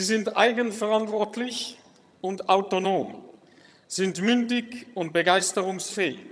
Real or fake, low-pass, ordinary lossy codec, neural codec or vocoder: fake; none; none; vocoder, 22.05 kHz, 80 mel bands, HiFi-GAN